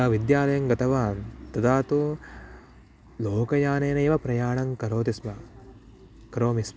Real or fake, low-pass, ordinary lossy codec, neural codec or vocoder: real; none; none; none